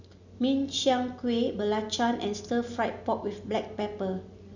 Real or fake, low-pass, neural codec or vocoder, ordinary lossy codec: real; 7.2 kHz; none; none